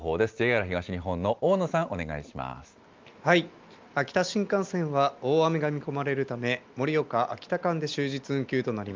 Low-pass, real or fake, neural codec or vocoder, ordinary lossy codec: 7.2 kHz; real; none; Opus, 24 kbps